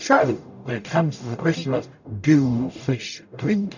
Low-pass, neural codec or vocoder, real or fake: 7.2 kHz; codec, 44.1 kHz, 0.9 kbps, DAC; fake